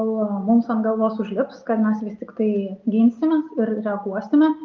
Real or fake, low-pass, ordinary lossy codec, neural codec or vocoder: real; 7.2 kHz; Opus, 32 kbps; none